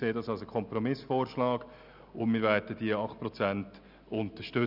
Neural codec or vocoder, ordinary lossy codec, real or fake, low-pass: none; none; real; 5.4 kHz